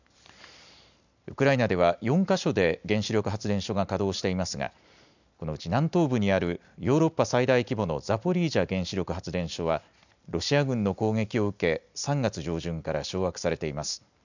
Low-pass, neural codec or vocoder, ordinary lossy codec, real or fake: 7.2 kHz; none; none; real